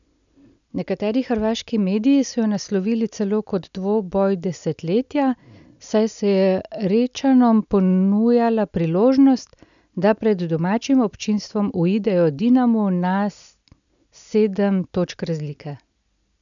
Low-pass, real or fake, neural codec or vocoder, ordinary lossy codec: 7.2 kHz; real; none; none